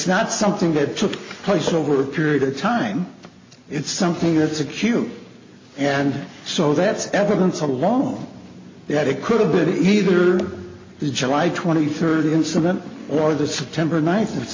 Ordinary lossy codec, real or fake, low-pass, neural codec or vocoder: MP3, 32 kbps; real; 7.2 kHz; none